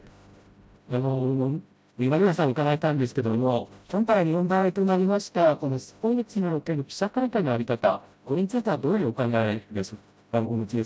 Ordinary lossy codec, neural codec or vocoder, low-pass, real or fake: none; codec, 16 kHz, 0.5 kbps, FreqCodec, smaller model; none; fake